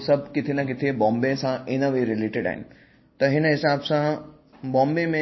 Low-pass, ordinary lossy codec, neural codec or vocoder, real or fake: 7.2 kHz; MP3, 24 kbps; none; real